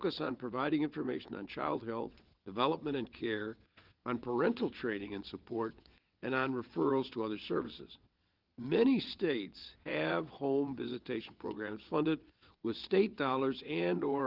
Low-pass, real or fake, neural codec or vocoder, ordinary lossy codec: 5.4 kHz; fake; vocoder, 22.05 kHz, 80 mel bands, WaveNeXt; Opus, 32 kbps